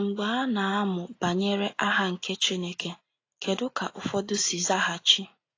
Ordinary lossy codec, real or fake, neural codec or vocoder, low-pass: AAC, 32 kbps; real; none; 7.2 kHz